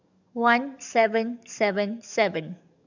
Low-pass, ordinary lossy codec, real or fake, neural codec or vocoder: 7.2 kHz; none; fake; codec, 44.1 kHz, 7.8 kbps, DAC